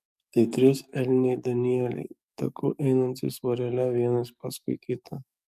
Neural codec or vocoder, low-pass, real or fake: codec, 44.1 kHz, 7.8 kbps, DAC; 14.4 kHz; fake